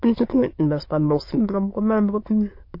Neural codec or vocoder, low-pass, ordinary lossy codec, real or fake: autoencoder, 22.05 kHz, a latent of 192 numbers a frame, VITS, trained on many speakers; 5.4 kHz; MP3, 32 kbps; fake